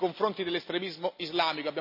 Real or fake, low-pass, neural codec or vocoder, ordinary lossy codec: real; 5.4 kHz; none; AAC, 48 kbps